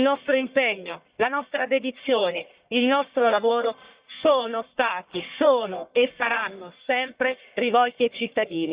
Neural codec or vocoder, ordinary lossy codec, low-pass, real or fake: codec, 44.1 kHz, 1.7 kbps, Pupu-Codec; Opus, 64 kbps; 3.6 kHz; fake